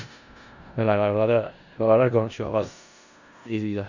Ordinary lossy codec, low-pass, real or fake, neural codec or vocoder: none; 7.2 kHz; fake; codec, 16 kHz in and 24 kHz out, 0.4 kbps, LongCat-Audio-Codec, four codebook decoder